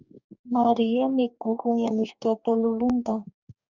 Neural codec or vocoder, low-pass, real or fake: codec, 44.1 kHz, 2.6 kbps, DAC; 7.2 kHz; fake